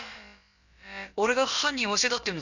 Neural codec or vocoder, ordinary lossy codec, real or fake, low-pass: codec, 16 kHz, about 1 kbps, DyCAST, with the encoder's durations; none; fake; 7.2 kHz